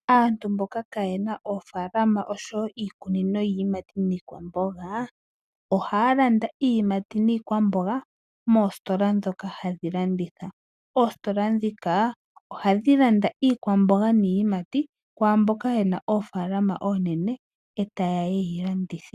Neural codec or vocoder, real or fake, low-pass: none; real; 14.4 kHz